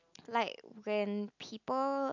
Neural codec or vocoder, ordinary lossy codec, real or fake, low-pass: none; none; real; 7.2 kHz